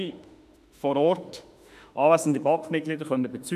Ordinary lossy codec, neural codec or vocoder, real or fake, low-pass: none; autoencoder, 48 kHz, 32 numbers a frame, DAC-VAE, trained on Japanese speech; fake; 14.4 kHz